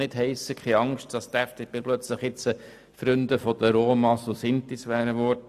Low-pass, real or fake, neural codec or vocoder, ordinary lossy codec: 14.4 kHz; real; none; AAC, 96 kbps